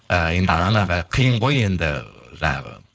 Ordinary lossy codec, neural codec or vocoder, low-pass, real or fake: none; codec, 16 kHz, 4.8 kbps, FACodec; none; fake